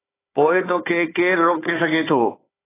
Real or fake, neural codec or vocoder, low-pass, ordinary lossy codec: fake; codec, 16 kHz, 4 kbps, FunCodec, trained on Chinese and English, 50 frames a second; 3.6 kHz; AAC, 16 kbps